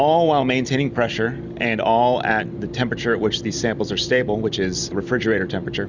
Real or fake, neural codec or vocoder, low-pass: real; none; 7.2 kHz